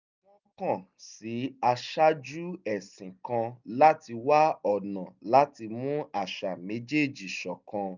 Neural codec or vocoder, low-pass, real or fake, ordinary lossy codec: none; 7.2 kHz; real; none